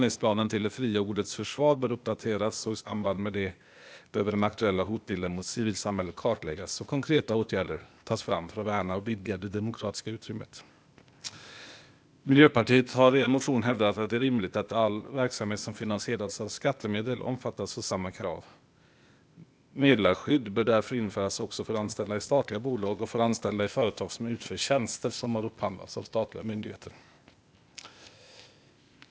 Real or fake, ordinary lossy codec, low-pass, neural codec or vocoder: fake; none; none; codec, 16 kHz, 0.8 kbps, ZipCodec